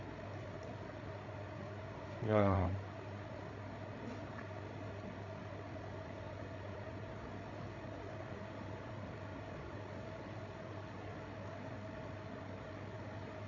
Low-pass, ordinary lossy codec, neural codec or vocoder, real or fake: 7.2 kHz; none; codec, 16 kHz, 8 kbps, FreqCodec, larger model; fake